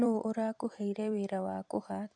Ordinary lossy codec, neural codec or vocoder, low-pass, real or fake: none; vocoder, 44.1 kHz, 128 mel bands every 256 samples, BigVGAN v2; 9.9 kHz; fake